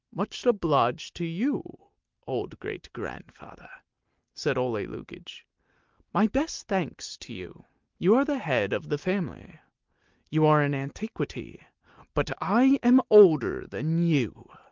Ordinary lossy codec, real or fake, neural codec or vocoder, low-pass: Opus, 32 kbps; real; none; 7.2 kHz